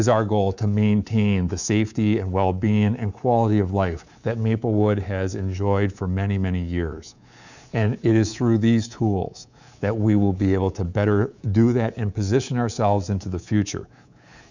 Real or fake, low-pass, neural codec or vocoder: fake; 7.2 kHz; codec, 24 kHz, 3.1 kbps, DualCodec